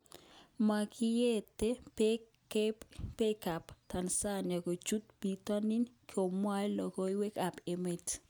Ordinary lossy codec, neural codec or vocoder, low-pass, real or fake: none; none; none; real